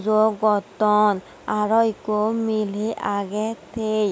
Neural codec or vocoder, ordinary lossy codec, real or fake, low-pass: none; none; real; none